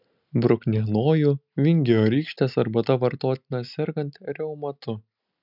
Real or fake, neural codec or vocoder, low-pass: real; none; 5.4 kHz